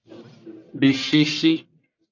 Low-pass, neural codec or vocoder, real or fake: 7.2 kHz; codec, 44.1 kHz, 1.7 kbps, Pupu-Codec; fake